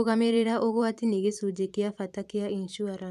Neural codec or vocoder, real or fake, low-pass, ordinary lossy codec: vocoder, 44.1 kHz, 128 mel bands every 512 samples, BigVGAN v2; fake; 14.4 kHz; none